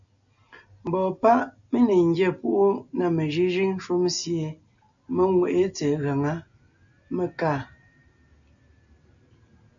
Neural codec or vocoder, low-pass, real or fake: none; 7.2 kHz; real